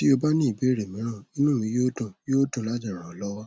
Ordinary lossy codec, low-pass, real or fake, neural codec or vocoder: none; none; real; none